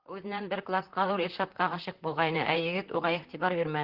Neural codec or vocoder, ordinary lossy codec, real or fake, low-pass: vocoder, 44.1 kHz, 128 mel bands, Pupu-Vocoder; Opus, 16 kbps; fake; 5.4 kHz